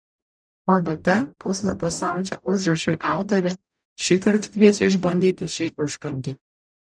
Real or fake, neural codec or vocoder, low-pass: fake; codec, 44.1 kHz, 0.9 kbps, DAC; 9.9 kHz